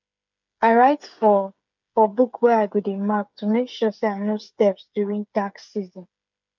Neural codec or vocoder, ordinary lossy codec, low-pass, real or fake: codec, 16 kHz, 8 kbps, FreqCodec, smaller model; none; 7.2 kHz; fake